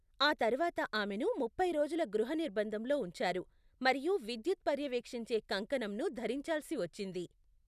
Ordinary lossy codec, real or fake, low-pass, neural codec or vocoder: none; real; 14.4 kHz; none